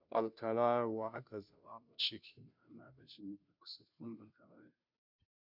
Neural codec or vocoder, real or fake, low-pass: codec, 16 kHz, 0.5 kbps, FunCodec, trained on Chinese and English, 25 frames a second; fake; 5.4 kHz